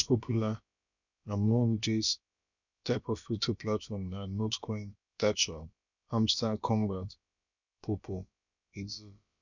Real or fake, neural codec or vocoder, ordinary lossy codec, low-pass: fake; codec, 16 kHz, about 1 kbps, DyCAST, with the encoder's durations; none; 7.2 kHz